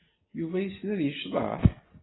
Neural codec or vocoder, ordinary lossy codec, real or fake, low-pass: none; AAC, 16 kbps; real; 7.2 kHz